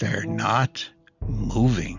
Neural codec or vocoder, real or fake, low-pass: none; real; 7.2 kHz